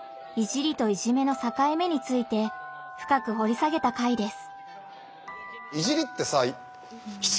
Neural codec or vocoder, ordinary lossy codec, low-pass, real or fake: none; none; none; real